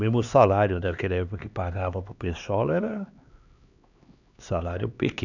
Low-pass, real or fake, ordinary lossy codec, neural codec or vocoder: 7.2 kHz; fake; none; codec, 16 kHz, 2 kbps, X-Codec, HuBERT features, trained on LibriSpeech